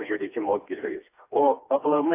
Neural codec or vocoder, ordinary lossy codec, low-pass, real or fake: codec, 16 kHz, 2 kbps, FreqCodec, smaller model; AAC, 24 kbps; 3.6 kHz; fake